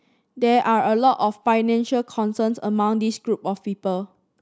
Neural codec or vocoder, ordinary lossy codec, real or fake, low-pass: none; none; real; none